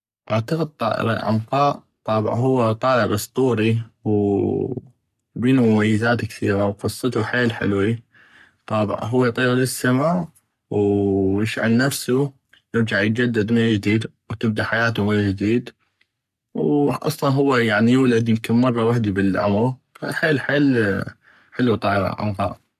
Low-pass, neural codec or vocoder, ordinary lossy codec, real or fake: 14.4 kHz; codec, 44.1 kHz, 3.4 kbps, Pupu-Codec; none; fake